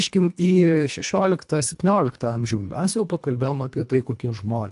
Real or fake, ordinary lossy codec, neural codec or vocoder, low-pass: fake; AAC, 64 kbps; codec, 24 kHz, 1.5 kbps, HILCodec; 10.8 kHz